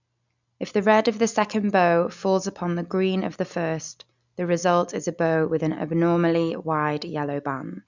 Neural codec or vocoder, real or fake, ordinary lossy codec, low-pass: none; real; none; 7.2 kHz